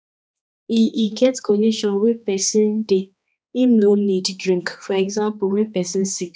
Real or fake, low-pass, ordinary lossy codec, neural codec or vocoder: fake; none; none; codec, 16 kHz, 2 kbps, X-Codec, HuBERT features, trained on balanced general audio